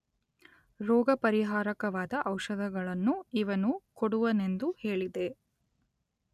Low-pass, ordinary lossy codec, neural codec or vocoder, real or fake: 14.4 kHz; none; none; real